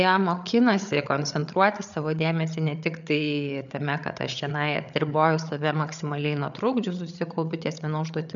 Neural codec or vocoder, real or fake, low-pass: codec, 16 kHz, 8 kbps, FreqCodec, larger model; fake; 7.2 kHz